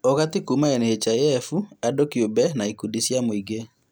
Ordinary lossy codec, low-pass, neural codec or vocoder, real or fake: none; none; none; real